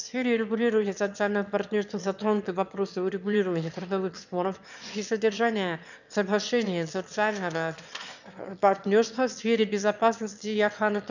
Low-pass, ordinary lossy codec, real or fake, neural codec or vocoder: 7.2 kHz; none; fake; autoencoder, 22.05 kHz, a latent of 192 numbers a frame, VITS, trained on one speaker